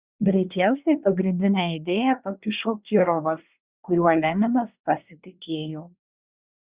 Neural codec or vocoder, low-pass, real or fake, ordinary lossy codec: codec, 24 kHz, 1 kbps, SNAC; 3.6 kHz; fake; Opus, 64 kbps